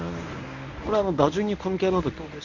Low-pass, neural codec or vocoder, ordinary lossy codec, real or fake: 7.2 kHz; codec, 24 kHz, 0.9 kbps, WavTokenizer, medium speech release version 1; none; fake